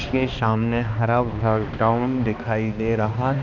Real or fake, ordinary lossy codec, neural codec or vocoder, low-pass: fake; MP3, 64 kbps; codec, 16 kHz, 2 kbps, X-Codec, HuBERT features, trained on balanced general audio; 7.2 kHz